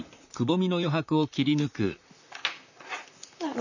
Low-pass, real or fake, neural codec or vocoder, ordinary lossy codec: 7.2 kHz; fake; vocoder, 44.1 kHz, 128 mel bands, Pupu-Vocoder; none